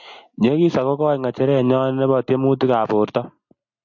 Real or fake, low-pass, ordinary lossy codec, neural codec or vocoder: real; 7.2 kHz; AAC, 48 kbps; none